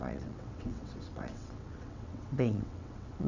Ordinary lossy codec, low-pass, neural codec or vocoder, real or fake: none; 7.2 kHz; vocoder, 22.05 kHz, 80 mel bands, WaveNeXt; fake